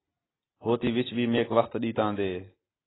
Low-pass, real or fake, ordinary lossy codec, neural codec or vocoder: 7.2 kHz; real; AAC, 16 kbps; none